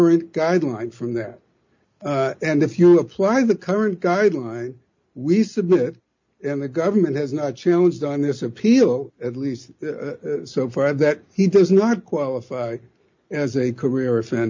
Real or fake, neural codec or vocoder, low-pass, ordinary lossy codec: real; none; 7.2 kHz; MP3, 64 kbps